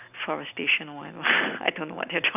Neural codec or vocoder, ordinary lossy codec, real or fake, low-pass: none; none; real; 3.6 kHz